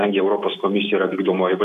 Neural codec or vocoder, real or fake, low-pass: none; real; 14.4 kHz